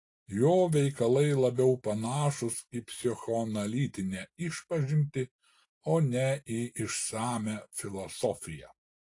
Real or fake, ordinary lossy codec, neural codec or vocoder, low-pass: real; AAC, 48 kbps; none; 10.8 kHz